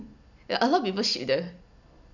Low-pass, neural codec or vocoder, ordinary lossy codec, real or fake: 7.2 kHz; none; none; real